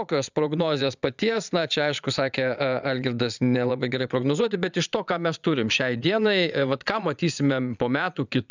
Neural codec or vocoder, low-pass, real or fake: vocoder, 22.05 kHz, 80 mel bands, Vocos; 7.2 kHz; fake